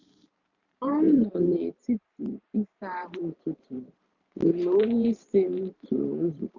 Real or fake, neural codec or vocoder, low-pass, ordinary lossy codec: fake; vocoder, 44.1 kHz, 128 mel bands every 512 samples, BigVGAN v2; 7.2 kHz; none